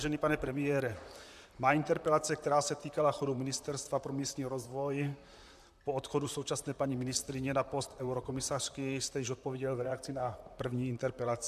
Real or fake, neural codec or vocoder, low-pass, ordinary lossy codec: fake; vocoder, 44.1 kHz, 128 mel bands, Pupu-Vocoder; 14.4 kHz; MP3, 96 kbps